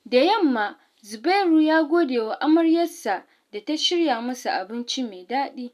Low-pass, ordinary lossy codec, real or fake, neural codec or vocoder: 14.4 kHz; none; real; none